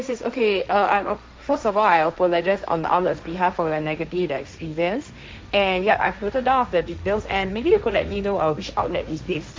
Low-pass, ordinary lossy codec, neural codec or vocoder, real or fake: none; none; codec, 16 kHz, 1.1 kbps, Voila-Tokenizer; fake